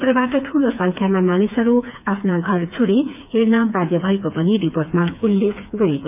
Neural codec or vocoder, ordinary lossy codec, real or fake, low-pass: codec, 16 kHz, 4 kbps, FreqCodec, smaller model; none; fake; 3.6 kHz